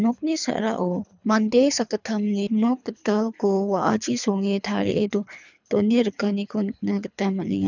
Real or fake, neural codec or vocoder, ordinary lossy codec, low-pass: fake; codec, 24 kHz, 3 kbps, HILCodec; none; 7.2 kHz